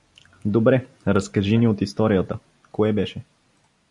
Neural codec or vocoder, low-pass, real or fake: none; 10.8 kHz; real